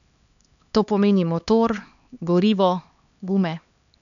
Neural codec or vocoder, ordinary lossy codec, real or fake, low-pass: codec, 16 kHz, 2 kbps, X-Codec, HuBERT features, trained on LibriSpeech; none; fake; 7.2 kHz